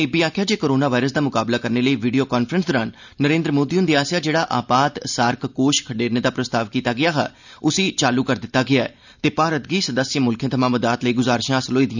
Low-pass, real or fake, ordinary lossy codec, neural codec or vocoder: 7.2 kHz; real; none; none